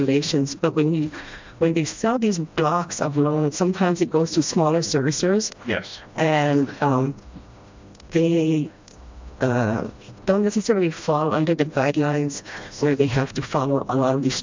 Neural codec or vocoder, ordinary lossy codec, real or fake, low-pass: codec, 16 kHz, 1 kbps, FreqCodec, smaller model; MP3, 64 kbps; fake; 7.2 kHz